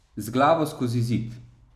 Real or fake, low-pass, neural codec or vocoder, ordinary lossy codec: real; 14.4 kHz; none; none